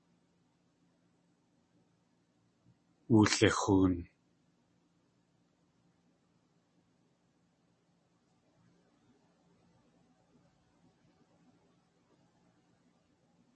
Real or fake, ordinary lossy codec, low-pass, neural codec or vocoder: real; MP3, 32 kbps; 10.8 kHz; none